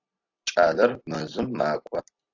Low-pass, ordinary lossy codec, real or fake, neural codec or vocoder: 7.2 kHz; AAC, 48 kbps; real; none